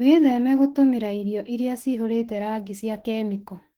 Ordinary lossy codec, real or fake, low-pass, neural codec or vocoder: Opus, 16 kbps; fake; 19.8 kHz; autoencoder, 48 kHz, 32 numbers a frame, DAC-VAE, trained on Japanese speech